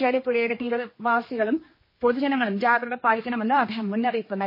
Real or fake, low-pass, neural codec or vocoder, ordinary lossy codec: fake; 5.4 kHz; codec, 16 kHz, 2 kbps, X-Codec, HuBERT features, trained on general audio; MP3, 24 kbps